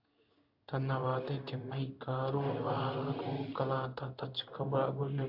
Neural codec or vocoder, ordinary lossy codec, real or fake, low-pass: codec, 16 kHz in and 24 kHz out, 1 kbps, XY-Tokenizer; AAC, 48 kbps; fake; 5.4 kHz